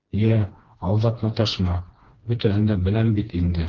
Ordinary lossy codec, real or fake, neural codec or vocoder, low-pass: Opus, 16 kbps; fake; codec, 16 kHz, 2 kbps, FreqCodec, smaller model; 7.2 kHz